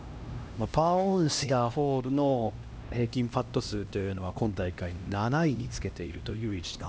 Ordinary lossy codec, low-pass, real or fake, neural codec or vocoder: none; none; fake; codec, 16 kHz, 1 kbps, X-Codec, HuBERT features, trained on LibriSpeech